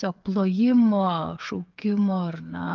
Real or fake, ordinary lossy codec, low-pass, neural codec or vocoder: fake; Opus, 32 kbps; 7.2 kHz; codec, 16 kHz, 8 kbps, FreqCodec, smaller model